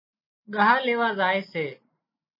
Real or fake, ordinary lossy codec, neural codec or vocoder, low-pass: real; MP3, 24 kbps; none; 5.4 kHz